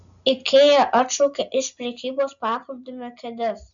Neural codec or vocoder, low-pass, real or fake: none; 7.2 kHz; real